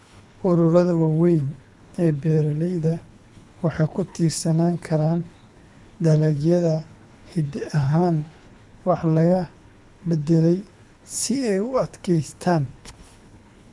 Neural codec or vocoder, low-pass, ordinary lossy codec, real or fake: codec, 24 kHz, 3 kbps, HILCodec; none; none; fake